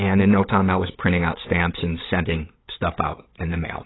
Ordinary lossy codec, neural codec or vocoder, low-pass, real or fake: AAC, 16 kbps; codec, 16 kHz, 8 kbps, FunCodec, trained on LibriTTS, 25 frames a second; 7.2 kHz; fake